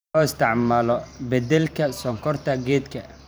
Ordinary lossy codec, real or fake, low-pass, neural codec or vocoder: none; fake; none; vocoder, 44.1 kHz, 128 mel bands every 512 samples, BigVGAN v2